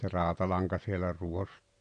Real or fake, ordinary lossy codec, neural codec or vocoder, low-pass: real; none; none; 9.9 kHz